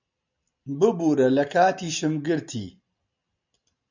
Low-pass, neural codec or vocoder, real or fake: 7.2 kHz; none; real